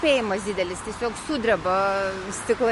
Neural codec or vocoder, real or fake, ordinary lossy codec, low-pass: none; real; MP3, 48 kbps; 14.4 kHz